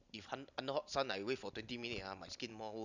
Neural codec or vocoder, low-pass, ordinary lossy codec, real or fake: none; 7.2 kHz; none; real